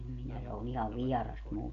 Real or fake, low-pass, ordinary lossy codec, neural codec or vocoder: real; 7.2 kHz; none; none